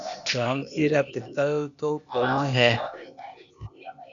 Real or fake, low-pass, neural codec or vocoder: fake; 7.2 kHz; codec, 16 kHz, 0.8 kbps, ZipCodec